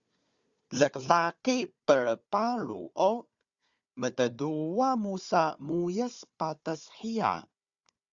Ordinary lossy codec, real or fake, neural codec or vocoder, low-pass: Opus, 64 kbps; fake; codec, 16 kHz, 4 kbps, FunCodec, trained on Chinese and English, 50 frames a second; 7.2 kHz